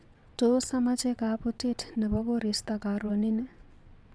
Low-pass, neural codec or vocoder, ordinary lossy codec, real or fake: none; vocoder, 22.05 kHz, 80 mel bands, WaveNeXt; none; fake